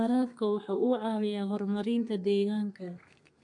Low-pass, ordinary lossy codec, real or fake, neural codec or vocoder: 10.8 kHz; MP3, 64 kbps; fake; codec, 32 kHz, 1.9 kbps, SNAC